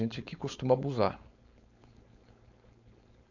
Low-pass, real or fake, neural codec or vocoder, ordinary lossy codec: 7.2 kHz; fake; codec, 16 kHz, 4.8 kbps, FACodec; none